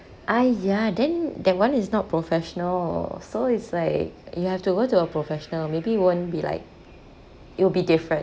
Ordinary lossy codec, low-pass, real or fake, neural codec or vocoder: none; none; real; none